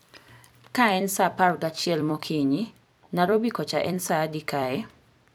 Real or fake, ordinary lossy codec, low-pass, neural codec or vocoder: fake; none; none; vocoder, 44.1 kHz, 128 mel bands every 256 samples, BigVGAN v2